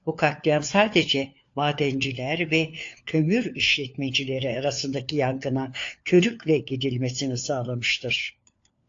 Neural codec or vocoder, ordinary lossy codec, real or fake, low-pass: codec, 16 kHz, 4 kbps, FunCodec, trained on LibriTTS, 50 frames a second; AAC, 48 kbps; fake; 7.2 kHz